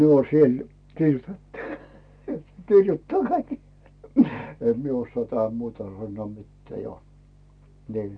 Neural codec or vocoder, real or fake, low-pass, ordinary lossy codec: none; real; 9.9 kHz; none